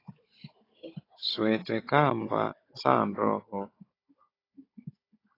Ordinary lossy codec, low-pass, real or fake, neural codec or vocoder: AAC, 24 kbps; 5.4 kHz; fake; codec, 16 kHz, 16 kbps, FunCodec, trained on Chinese and English, 50 frames a second